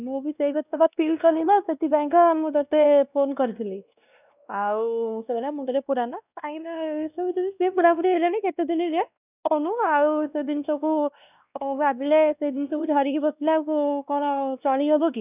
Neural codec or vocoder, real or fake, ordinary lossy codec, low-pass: codec, 16 kHz, 1 kbps, X-Codec, WavLM features, trained on Multilingual LibriSpeech; fake; none; 3.6 kHz